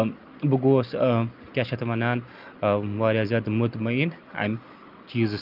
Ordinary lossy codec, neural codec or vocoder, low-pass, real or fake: Opus, 24 kbps; none; 5.4 kHz; real